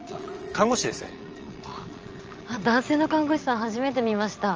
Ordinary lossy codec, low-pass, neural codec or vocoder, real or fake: Opus, 24 kbps; 7.2 kHz; vocoder, 22.05 kHz, 80 mel bands, Vocos; fake